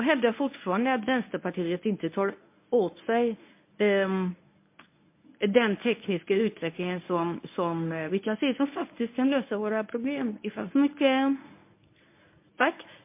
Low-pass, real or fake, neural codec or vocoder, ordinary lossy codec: 3.6 kHz; fake; codec, 24 kHz, 0.9 kbps, WavTokenizer, medium speech release version 1; MP3, 24 kbps